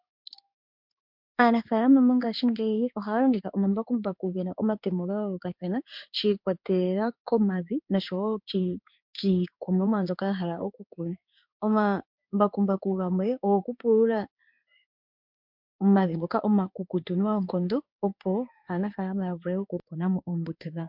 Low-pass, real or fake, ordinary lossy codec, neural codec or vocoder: 5.4 kHz; fake; MP3, 48 kbps; codec, 16 kHz in and 24 kHz out, 1 kbps, XY-Tokenizer